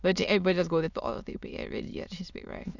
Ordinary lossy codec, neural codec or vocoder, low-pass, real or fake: none; autoencoder, 22.05 kHz, a latent of 192 numbers a frame, VITS, trained on many speakers; 7.2 kHz; fake